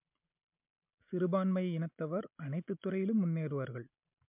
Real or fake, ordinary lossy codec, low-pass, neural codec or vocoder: real; MP3, 32 kbps; 3.6 kHz; none